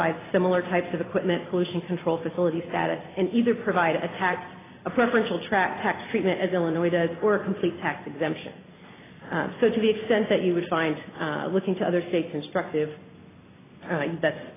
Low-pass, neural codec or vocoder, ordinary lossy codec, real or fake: 3.6 kHz; none; AAC, 16 kbps; real